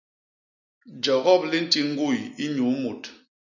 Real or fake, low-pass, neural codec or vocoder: real; 7.2 kHz; none